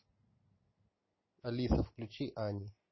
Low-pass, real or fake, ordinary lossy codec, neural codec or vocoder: 7.2 kHz; real; MP3, 24 kbps; none